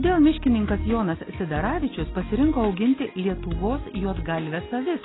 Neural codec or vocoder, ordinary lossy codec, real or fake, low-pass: none; AAC, 16 kbps; real; 7.2 kHz